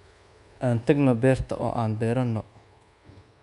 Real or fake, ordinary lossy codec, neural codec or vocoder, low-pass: fake; none; codec, 24 kHz, 1.2 kbps, DualCodec; 10.8 kHz